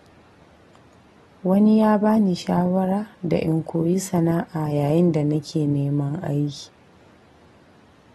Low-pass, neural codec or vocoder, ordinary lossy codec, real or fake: 19.8 kHz; none; AAC, 32 kbps; real